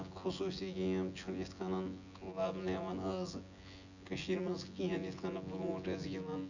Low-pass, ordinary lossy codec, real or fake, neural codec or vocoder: 7.2 kHz; none; fake; vocoder, 24 kHz, 100 mel bands, Vocos